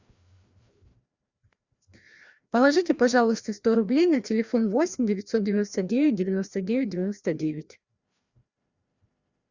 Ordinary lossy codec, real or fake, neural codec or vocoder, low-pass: Opus, 64 kbps; fake; codec, 16 kHz, 1 kbps, FreqCodec, larger model; 7.2 kHz